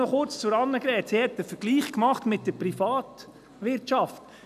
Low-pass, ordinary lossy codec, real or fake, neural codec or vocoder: 14.4 kHz; none; real; none